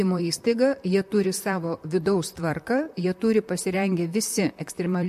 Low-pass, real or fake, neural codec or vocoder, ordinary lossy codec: 14.4 kHz; fake; vocoder, 44.1 kHz, 128 mel bands, Pupu-Vocoder; MP3, 64 kbps